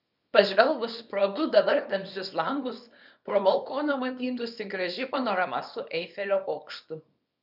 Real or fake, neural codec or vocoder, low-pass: fake; codec, 24 kHz, 0.9 kbps, WavTokenizer, small release; 5.4 kHz